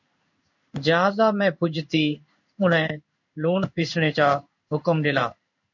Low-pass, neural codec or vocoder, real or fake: 7.2 kHz; codec, 16 kHz in and 24 kHz out, 1 kbps, XY-Tokenizer; fake